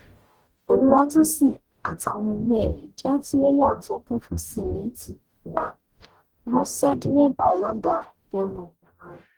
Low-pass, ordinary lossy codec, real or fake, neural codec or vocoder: 19.8 kHz; Opus, 24 kbps; fake; codec, 44.1 kHz, 0.9 kbps, DAC